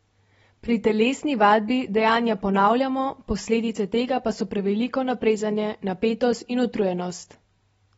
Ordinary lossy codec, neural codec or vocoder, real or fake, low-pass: AAC, 24 kbps; none; real; 10.8 kHz